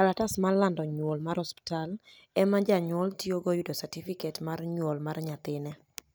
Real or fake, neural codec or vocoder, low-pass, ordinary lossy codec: real; none; none; none